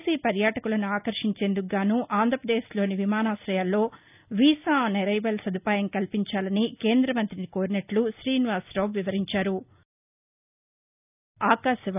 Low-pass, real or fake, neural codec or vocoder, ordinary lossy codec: 3.6 kHz; real; none; none